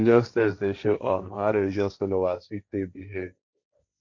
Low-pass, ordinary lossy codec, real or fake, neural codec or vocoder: 7.2 kHz; none; fake; codec, 16 kHz, 1.1 kbps, Voila-Tokenizer